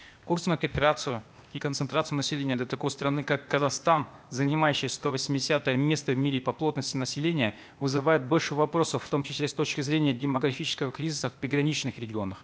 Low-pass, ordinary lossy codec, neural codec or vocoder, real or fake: none; none; codec, 16 kHz, 0.8 kbps, ZipCodec; fake